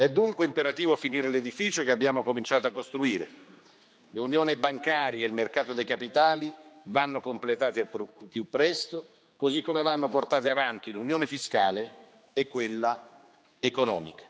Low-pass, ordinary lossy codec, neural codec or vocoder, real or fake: none; none; codec, 16 kHz, 2 kbps, X-Codec, HuBERT features, trained on general audio; fake